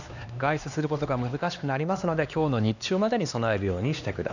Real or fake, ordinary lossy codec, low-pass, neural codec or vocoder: fake; none; 7.2 kHz; codec, 16 kHz, 2 kbps, X-Codec, HuBERT features, trained on LibriSpeech